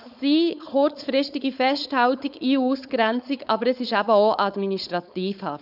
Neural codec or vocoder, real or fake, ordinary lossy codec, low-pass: codec, 16 kHz, 4.8 kbps, FACodec; fake; none; 5.4 kHz